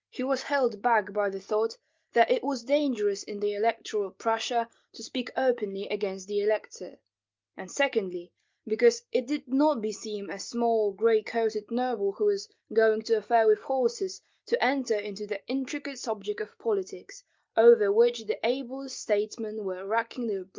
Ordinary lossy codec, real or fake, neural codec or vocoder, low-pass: Opus, 24 kbps; real; none; 7.2 kHz